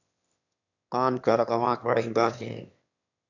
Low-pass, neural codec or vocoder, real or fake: 7.2 kHz; autoencoder, 22.05 kHz, a latent of 192 numbers a frame, VITS, trained on one speaker; fake